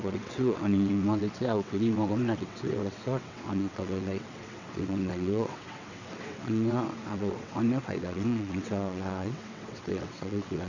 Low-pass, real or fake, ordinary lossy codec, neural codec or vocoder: 7.2 kHz; fake; none; vocoder, 22.05 kHz, 80 mel bands, WaveNeXt